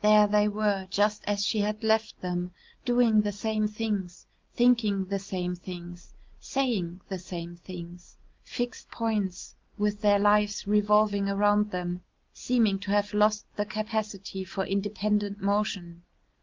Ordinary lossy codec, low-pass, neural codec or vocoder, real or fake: Opus, 32 kbps; 7.2 kHz; none; real